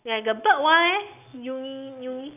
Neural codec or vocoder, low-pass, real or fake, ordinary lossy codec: none; 3.6 kHz; real; none